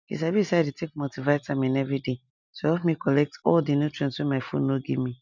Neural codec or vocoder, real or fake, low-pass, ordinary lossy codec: none; real; 7.2 kHz; none